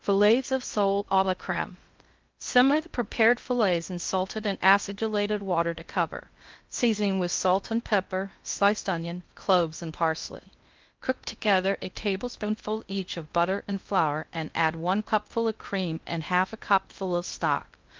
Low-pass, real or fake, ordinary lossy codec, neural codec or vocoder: 7.2 kHz; fake; Opus, 24 kbps; codec, 16 kHz in and 24 kHz out, 0.6 kbps, FocalCodec, streaming, 2048 codes